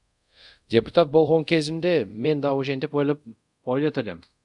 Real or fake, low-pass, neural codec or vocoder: fake; 10.8 kHz; codec, 24 kHz, 0.5 kbps, DualCodec